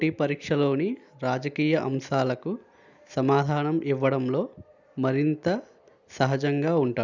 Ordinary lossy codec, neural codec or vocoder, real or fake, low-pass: none; none; real; 7.2 kHz